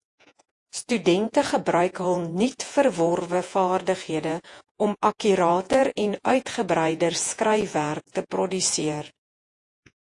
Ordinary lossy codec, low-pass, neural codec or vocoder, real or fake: AAC, 64 kbps; 10.8 kHz; vocoder, 48 kHz, 128 mel bands, Vocos; fake